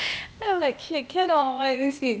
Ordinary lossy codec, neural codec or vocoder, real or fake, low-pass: none; codec, 16 kHz, 0.8 kbps, ZipCodec; fake; none